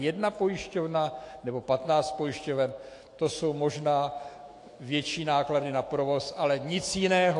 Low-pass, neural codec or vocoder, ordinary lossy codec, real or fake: 10.8 kHz; autoencoder, 48 kHz, 128 numbers a frame, DAC-VAE, trained on Japanese speech; AAC, 48 kbps; fake